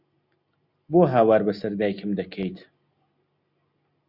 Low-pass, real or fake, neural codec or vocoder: 5.4 kHz; real; none